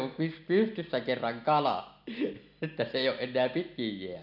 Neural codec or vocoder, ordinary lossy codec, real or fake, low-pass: none; none; real; 5.4 kHz